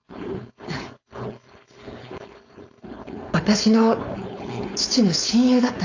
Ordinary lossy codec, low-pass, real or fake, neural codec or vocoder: AAC, 48 kbps; 7.2 kHz; fake; codec, 16 kHz, 4.8 kbps, FACodec